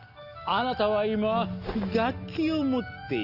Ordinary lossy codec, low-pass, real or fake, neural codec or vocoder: Opus, 32 kbps; 5.4 kHz; real; none